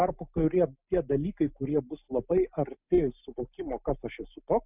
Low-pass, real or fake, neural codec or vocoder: 3.6 kHz; real; none